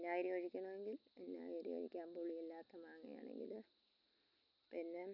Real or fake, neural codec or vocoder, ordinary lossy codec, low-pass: real; none; none; 5.4 kHz